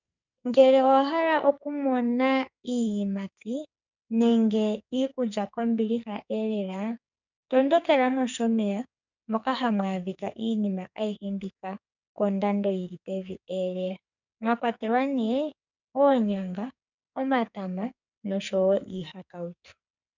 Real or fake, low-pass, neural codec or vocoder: fake; 7.2 kHz; codec, 44.1 kHz, 2.6 kbps, SNAC